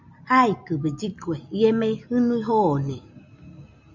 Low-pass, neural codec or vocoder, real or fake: 7.2 kHz; none; real